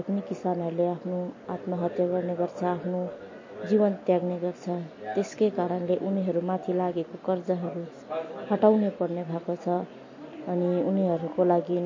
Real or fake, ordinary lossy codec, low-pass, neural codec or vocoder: real; MP3, 32 kbps; 7.2 kHz; none